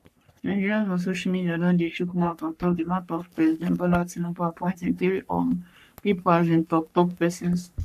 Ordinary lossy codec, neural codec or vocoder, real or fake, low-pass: none; codec, 44.1 kHz, 3.4 kbps, Pupu-Codec; fake; 14.4 kHz